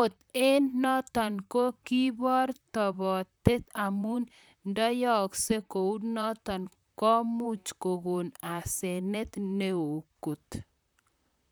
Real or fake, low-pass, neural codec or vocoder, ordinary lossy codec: fake; none; vocoder, 44.1 kHz, 128 mel bands, Pupu-Vocoder; none